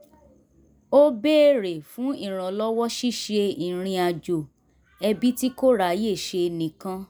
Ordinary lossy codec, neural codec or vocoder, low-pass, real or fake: none; none; none; real